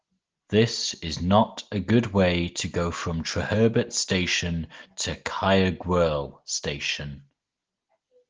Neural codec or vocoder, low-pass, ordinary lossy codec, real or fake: none; 7.2 kHz; Opus, 16 kbps; real